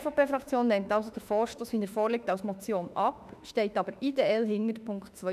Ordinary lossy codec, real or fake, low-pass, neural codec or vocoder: none; fake; 14.4 kHz; autoencoder, 48 kHz, 32 numbers a frame, DAC-VAE, trained on Japanese speech